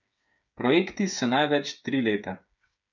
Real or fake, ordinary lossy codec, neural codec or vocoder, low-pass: fake; none; codec, 16 kHz, 8 kbps, FreqCodec, smaller model; 7.2 kHz